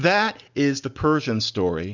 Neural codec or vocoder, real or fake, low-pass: none; real; 7.2 kHz